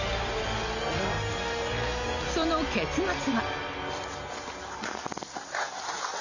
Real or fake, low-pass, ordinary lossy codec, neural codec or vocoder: real; 7.2 kHz; none; none